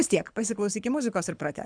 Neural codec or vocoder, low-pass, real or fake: codec, 24 kHz, 6 kbps, HILCodec; 9.9 kHz; fake